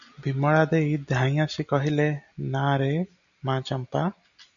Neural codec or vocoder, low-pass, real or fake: none; 7.2 kHz; real